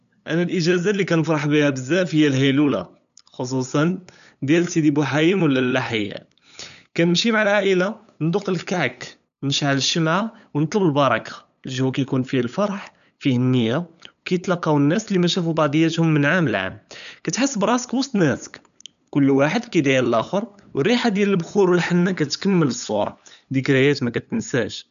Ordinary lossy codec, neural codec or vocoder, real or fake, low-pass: none; codec, 16 kHz, 8 kbps, FunCodec, trained on LibriTTS, 25 frames a second; fake; 7.2 kHz